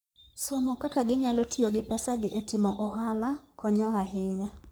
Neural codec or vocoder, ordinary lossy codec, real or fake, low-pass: codec, 44.1 kHz, 3.4 kbps, Pupu-Codec; none; fake; none